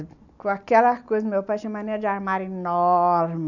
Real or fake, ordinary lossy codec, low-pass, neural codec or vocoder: real; none; 7.2 kHz; none